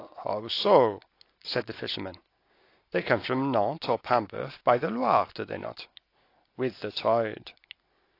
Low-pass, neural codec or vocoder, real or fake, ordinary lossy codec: 5.4 kHz; none; real; AAC, 32 kbps